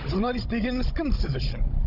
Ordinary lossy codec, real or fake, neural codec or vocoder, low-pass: none; fake; codec, 16 kHz, 16 kbps, FunCodec, trained on Chinese and English, 50 frames a second; 5.4 kHz